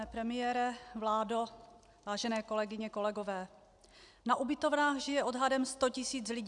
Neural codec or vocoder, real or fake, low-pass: none; real; 10.8 kHz